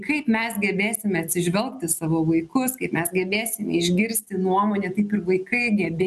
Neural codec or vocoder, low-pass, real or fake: none; 14.4 kHz; real